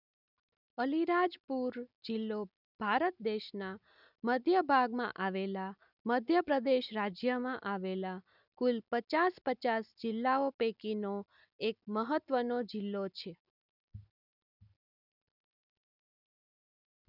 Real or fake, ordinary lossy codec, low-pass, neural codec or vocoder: real; none; 5.4 kHz; none